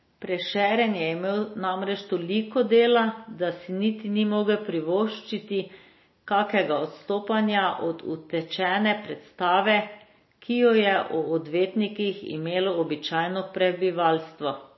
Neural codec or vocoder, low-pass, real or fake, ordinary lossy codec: none; 7.2 kHz; real; MP3, 24 kbps